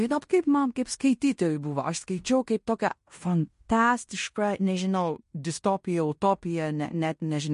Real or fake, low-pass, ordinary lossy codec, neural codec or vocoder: fake; 10.8 kHz; MP3, 48 kbps; codec, 16 kHz in and 24 kHz out, 0.9 kbps, LongCat-Audio-Codec, fine tuned four codebook decoder